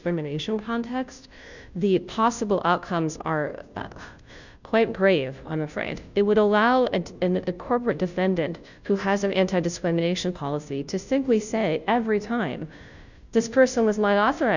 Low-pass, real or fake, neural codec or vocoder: 7.2 kHz; fake; codec, 16 kHz, 0.5 kbps, FunCodec, trained on Chinese and English, 25 frames a second